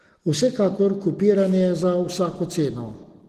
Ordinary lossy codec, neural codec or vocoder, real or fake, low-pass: Opus, 16 kbps; none; real; 14.4 kHz